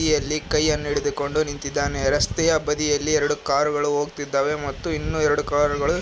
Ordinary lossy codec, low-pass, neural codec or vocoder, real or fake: none; none; none; real